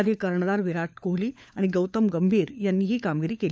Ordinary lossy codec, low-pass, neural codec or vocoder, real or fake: none; none; codec, 16 kHz, 4 kbps, FunCodec, trained on LibriTTS, 50 frames a second; fake